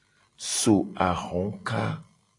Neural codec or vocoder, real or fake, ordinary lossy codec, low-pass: none; real; AAC, 48 kbps; 10.8 kHz